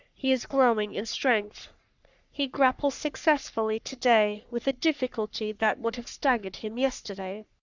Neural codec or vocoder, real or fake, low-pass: codec, 44.1 kHz, 3.4 kbps, Pupu-Codec; fake; 7.2 kHz